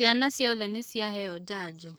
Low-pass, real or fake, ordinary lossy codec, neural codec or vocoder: none; fake; none; codec, 44.1 kHz, 2.6 kbps, SNAC